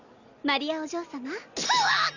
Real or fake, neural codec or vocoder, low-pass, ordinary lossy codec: real; none; 7.2 kHz; none